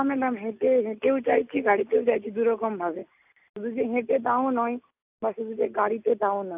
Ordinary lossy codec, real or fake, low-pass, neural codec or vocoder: AAC, 32 kbps; real; 3.6 kHz; none